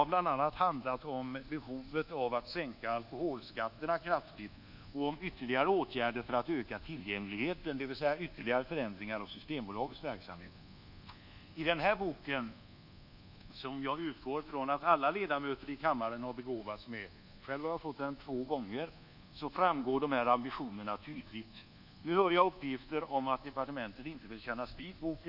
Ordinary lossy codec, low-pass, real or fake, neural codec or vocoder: none; 5.4 kHz; fake; codec, 24 kHz, 1.2 kbps, DualCodec